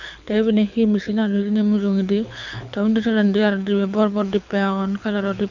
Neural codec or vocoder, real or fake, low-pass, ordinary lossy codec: codec, 24 kHz, 6 kbps, HILCodec; fake; 7.2 kHz; none